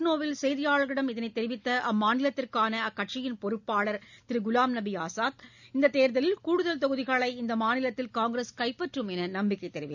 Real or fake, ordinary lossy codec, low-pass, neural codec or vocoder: real; none; 7.2 kHz; none